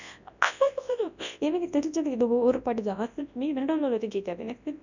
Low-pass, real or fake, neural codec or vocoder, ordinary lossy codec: 7.2 kHz; fake; codec, 24 kHz, 0.9 kbps, WavTokenizer, large speech release; none